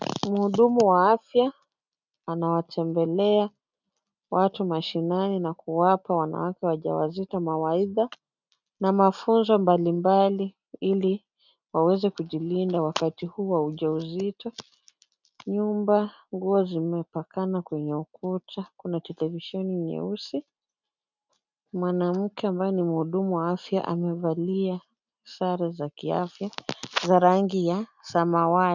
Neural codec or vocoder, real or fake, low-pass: none; real; 7.2 kHz